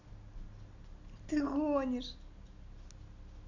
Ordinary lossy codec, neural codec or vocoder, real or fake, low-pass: none; none; real; 7.2 kHz